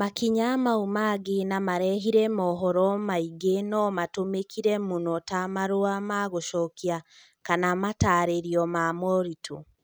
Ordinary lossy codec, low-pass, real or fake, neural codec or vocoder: none; none; real; none